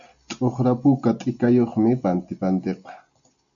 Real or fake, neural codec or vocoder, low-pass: real; none; 7.2 kHz